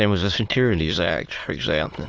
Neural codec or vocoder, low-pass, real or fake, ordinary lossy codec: autoencoder, 22.05 kHz, a latent of 192 numbers a frame, VITS, trained on many speakers; 7.2 kHz; fake; Opus, 24 kbps